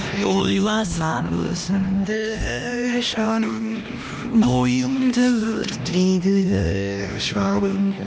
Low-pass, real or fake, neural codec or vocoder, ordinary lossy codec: none; fake; codec, 16 kHz, 1 kbps, X-Codec, HuBERT features, trained on LibriSpeech; none